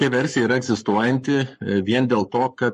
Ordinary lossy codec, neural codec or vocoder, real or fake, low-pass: MP3, 48 kbps; codec, 44.1 kHz, 7.8 kbps, Pupu-Codec; fake; 14.4 kHz